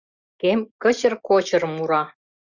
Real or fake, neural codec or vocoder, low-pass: real; none; 7.2 kHz